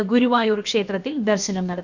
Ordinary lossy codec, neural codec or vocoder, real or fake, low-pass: none; codec, 16 kHz, about 1 kbps, DyCAST, with the encoder's durations; fake; 7.2 kHz